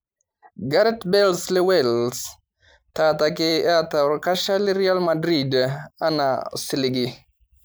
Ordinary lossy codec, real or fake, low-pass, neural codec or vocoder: none; fake; none; vocoder, 44.1 kHz, 128 mel bands every 512 samples, BigVGAN v2